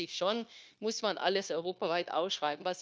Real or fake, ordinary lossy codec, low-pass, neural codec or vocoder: fake; none; none; codec, 16 kHz, 0.9 kbps, LongCat-Audio-Codec